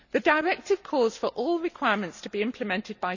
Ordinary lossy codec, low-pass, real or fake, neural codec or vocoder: none; 7.2 kHz; real; none